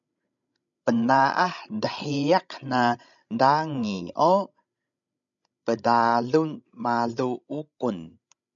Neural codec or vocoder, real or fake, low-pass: codec, 16 kHz, 16 kbps, FreqCodec, larger model; fake; 7.2 kHz